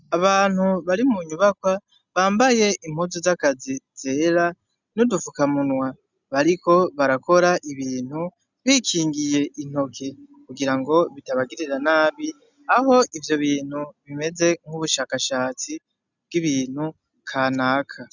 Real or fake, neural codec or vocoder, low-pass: real; none; 7.2 kHz